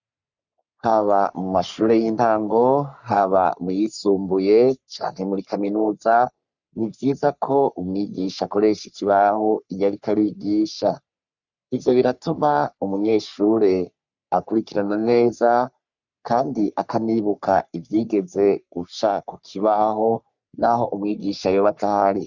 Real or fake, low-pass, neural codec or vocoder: fake; 7.2 kHz; codec, 44.1 kHz, 3.4 kbps, Pupu-Codec